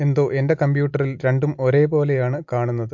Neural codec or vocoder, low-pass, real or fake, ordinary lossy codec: none; 7.2 kHz; real; MP3, 48 kbps